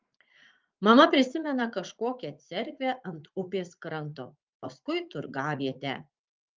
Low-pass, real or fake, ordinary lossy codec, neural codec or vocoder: 7.2 kHz; fake; Opus, 24 kbps; vocoder, 44.1 kHz, 80 mel bands, Vocos